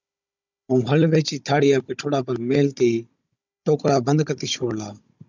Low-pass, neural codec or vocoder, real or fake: 7.2 kHz; codec, 16 kHz, 16 kbps, FunCodec, trained on Chinese and English, 50 frames a second; fake